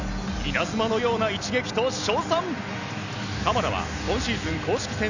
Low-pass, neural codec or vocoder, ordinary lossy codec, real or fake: 7.2 kHz; none; none; real